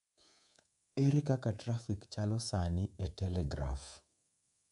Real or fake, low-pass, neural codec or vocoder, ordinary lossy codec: fake; 10.8 kHz; codec, 24 kHz, 3.1 kbps, DualCodec; none